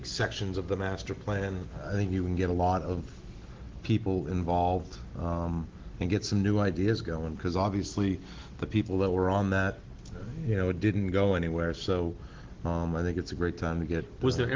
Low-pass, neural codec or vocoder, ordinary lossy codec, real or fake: 7.2 kHz; none; Opus, 16 kbps; real